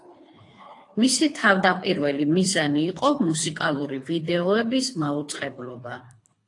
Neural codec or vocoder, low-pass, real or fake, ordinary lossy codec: codec, 24 kHz, 3 kbps, HILCodec; 10.8 kHz; fake; AAC, 48 kbps